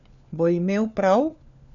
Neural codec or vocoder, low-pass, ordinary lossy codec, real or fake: codec, 16 kHz, 4 kbps, FunCodec, trained on LibriTTS, 50 frames a second; 7.2 kHz; none; fake